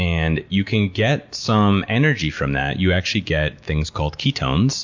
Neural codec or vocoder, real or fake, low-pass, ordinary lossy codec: none; real; 7.2 kHz; MP3, 48 kbps